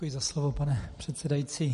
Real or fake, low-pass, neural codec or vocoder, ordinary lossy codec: real; 14.4 kHz; none; MP3, 48 kbps